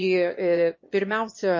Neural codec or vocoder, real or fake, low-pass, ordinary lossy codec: autoencoder, 22.05 kHz, a latent of 192 numbers a frame, VITS, trained on one speaker; fake; 7.2 kHz; MP3, 32 kbps